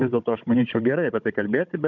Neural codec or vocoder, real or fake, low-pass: codec, 16 kHz, 16 kbps, FunCodec, trained on Chinese and English, 50 frames a second; fake; 7.2 kHz